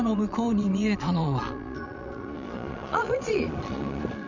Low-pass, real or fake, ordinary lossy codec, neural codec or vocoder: 7.2 kHz; fake; none; vocoder, 22.05 kHz, 80 mel bands, Vocos